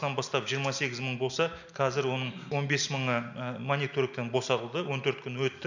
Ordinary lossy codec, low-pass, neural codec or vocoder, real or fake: none; 7.2 kHz; none; real